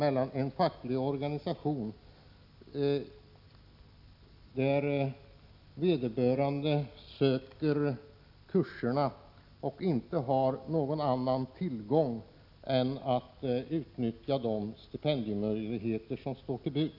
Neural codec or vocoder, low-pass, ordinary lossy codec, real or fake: none; 5.4 kHz; none; real